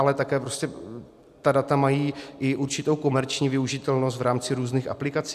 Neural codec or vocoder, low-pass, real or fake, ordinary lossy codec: none; 14.4 kHz; real; AAC, 96 kbps